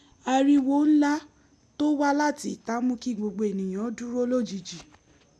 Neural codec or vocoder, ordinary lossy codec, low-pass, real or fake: none; none; none; real